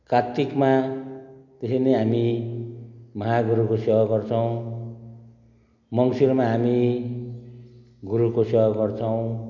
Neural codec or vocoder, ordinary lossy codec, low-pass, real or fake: none; none; 7.2 kHz; real